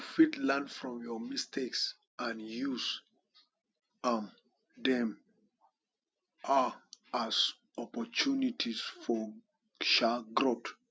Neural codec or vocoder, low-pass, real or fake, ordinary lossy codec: none; none; real; none